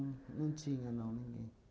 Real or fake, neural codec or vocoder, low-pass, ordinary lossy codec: real; none; none; none